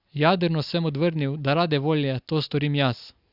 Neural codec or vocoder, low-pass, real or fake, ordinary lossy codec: none; 5.4 kHz; real; Opus, 64 kbps